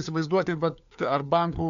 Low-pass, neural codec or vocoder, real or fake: 7.2 kHz; codec, 16 kHz, 4 kbps, FunCodec, trained on LibriTTS, 50 frames a second; fake